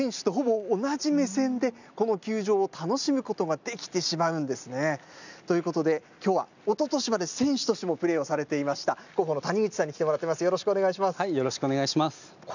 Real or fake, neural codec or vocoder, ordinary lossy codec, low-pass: real; none; none; 7.2 kHz